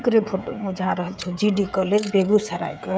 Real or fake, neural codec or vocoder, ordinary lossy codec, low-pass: fake; codec, 16 kHz, 16 kbps, FreqCodec, smaller model; none; none